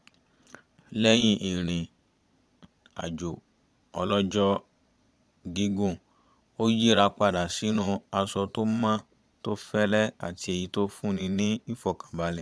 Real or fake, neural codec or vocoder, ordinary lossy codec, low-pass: fake; vocoder, 22.05 kHz, 80 mel bands, Vocos; none; none